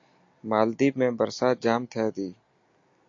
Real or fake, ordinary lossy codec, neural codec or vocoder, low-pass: real; AAC, 48 kbps; none; 7.2 kHz